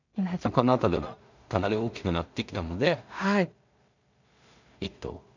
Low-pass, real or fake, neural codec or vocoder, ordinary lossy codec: 7.2 kHz; fake; codec, 16 kHz in and 24 kHz out, 0.4 kbps, LongCat-Audio-Codec, two codebook decoder; none